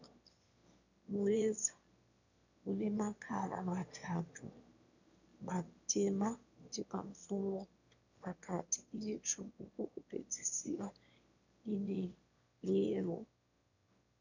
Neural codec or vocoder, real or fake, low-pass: autoencoder, 22.05 kHz, a latent of 192 numbers a frame, VITS, trained on one speaker; fake; 7.2 kHz